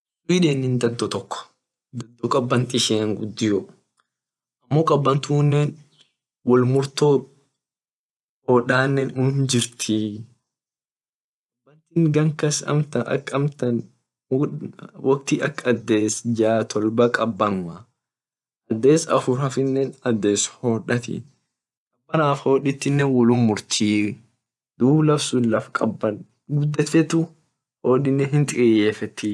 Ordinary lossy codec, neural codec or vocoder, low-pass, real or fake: none; vocoder, 24 kHz, 100 mel bands, Vocos; none; fake